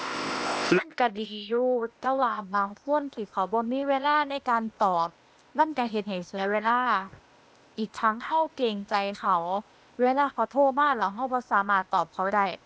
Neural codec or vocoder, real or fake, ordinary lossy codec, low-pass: codec, 16 kHz, 0.8 kbps, ZipCodec; fake; none; none